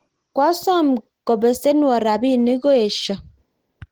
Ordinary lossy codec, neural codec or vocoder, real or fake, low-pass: Opus, 16 kbps; none; real; 19.8 kHz